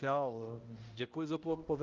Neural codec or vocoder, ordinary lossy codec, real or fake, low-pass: codec, 16 kHz, 0.5 kbps, X-Codec, HuBERT features, trained on balanced general audio; Opus, 32 kbps; fake; 7.2 kHz